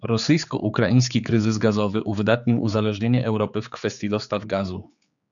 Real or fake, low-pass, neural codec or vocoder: fake; 7.2 kHz; codec, 16 kHz, 4 kbps, X-Codec, HuBERT features, trained on general audio